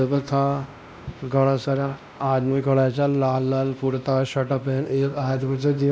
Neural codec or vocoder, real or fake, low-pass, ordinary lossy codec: codec, 16 kHz, 1 kbps, X-Codec, WavLM features, trained on Multilingual LibriSpeech; fake; none; none